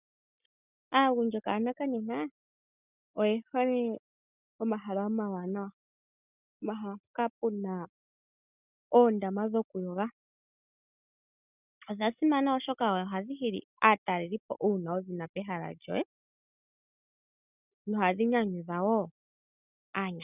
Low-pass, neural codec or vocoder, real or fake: 3.6 kHz; none; real